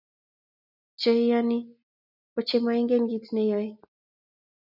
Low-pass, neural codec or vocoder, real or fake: 5.4 kHz; none; real